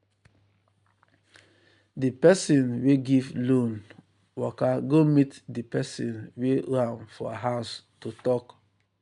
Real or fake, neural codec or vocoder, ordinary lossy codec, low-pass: real; none; none; 10.8 kHz